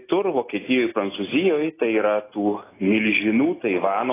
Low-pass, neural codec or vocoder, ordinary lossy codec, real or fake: 3.6 kHz; none; AAC, 16 kbps; real